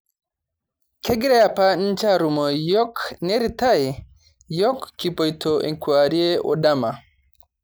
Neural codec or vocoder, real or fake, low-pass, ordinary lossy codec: none; real; none; none